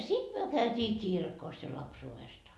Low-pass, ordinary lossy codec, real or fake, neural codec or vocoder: none; none; real; none